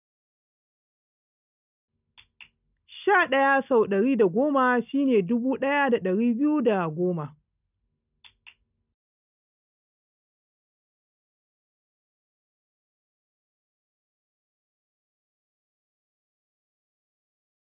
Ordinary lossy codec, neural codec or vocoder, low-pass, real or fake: none; none; 3.6 kHz; real